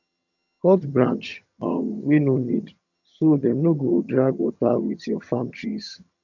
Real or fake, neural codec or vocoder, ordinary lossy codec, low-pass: fake; vocoder, 22.05 kHz, 80 mel bands, HiFi-GAN; none; 7.2 kHz